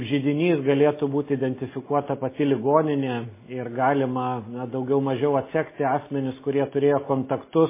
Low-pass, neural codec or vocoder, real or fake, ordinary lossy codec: 3.6 kHz; none; real; MP3, 16 kbps